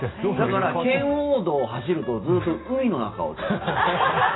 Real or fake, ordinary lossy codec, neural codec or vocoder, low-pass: real; AAC, 16 kbps; none; 7.2 kHz